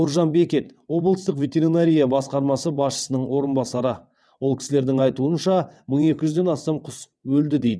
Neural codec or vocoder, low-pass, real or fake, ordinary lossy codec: vocoder, 22.05 kHz, 80 mel bands, WaveNeXt; none; fake; none